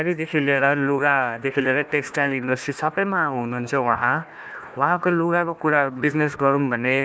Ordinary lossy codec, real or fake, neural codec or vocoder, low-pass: none; fake; codec, 16 kHz, 1 kbps, FunCodec, trained on Chinese and English, 50 frames a second; none